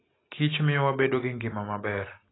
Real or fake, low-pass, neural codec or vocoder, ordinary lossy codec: real; 7.2 kHz; none; AAC, 16 kbps